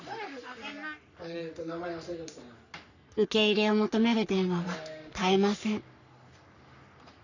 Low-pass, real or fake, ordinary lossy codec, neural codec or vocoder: 7.2 kHz; fake; none; codec, 44.1 kHz, 3.4 kbps, Pupu-Codec